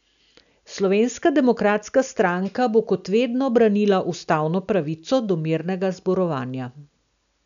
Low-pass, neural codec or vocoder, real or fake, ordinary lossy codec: 7.2 kHz; none; real; none